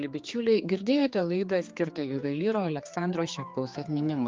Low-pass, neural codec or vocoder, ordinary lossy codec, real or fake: 7.2 kHz; codec, 16 kHz, 4 kbps, X-Codec, HuBERT features, trained on general audio; Opus, 24 kbps; fake